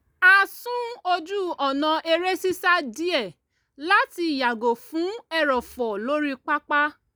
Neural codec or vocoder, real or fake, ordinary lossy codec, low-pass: none; real; none; none